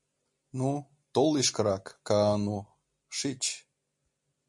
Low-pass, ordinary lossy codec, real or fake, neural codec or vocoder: 10.8 kHz; MP3, 48 kbps; real; none